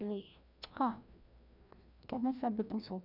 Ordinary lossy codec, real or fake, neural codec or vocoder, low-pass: none; fake; codec, 16 kHz, 1 kbps, FreqCodec, larger model; 5.4 kHz